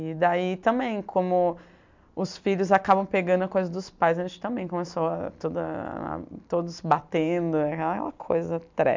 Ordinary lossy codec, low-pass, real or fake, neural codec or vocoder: MP3, 64 kbps; 7.2 kHz; real; none